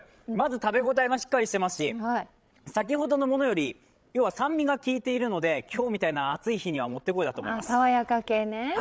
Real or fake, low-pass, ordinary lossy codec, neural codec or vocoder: fake; none; none; codec, 16 kHz, 8 kbps, FreqCodec, larger model